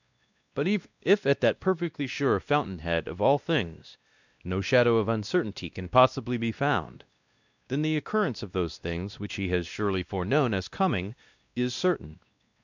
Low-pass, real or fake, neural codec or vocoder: 7.2 kHz; fake; codec, 16 kHz, 1 kbps, X-Codec, WavLM features, trained on Multilingual LibriSpeech